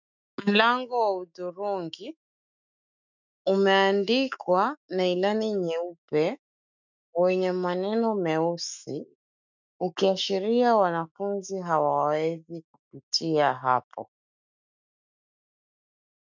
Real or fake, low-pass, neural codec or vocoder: fake; 7.2 kHz; autoencoder, 48 kHz, 128 numbers a frame, DAC-VAE, trained on Japanese speech